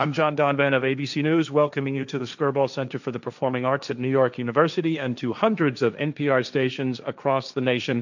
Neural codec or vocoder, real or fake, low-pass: codec, 16 kHz, 1.1 kbps, Voila-Tokenizer; fake; 7.2 kHz